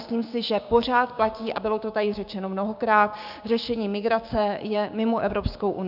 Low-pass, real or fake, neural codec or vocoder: 5.4 kHz; fake; codec, 16 kHz, 6 kbps, DAC